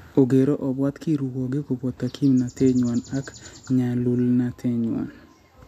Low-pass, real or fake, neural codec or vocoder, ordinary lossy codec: 14.4 kHz; real; none; none